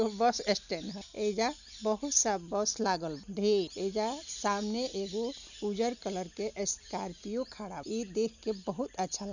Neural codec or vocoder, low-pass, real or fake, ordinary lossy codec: none; 7.2 kHz; real; none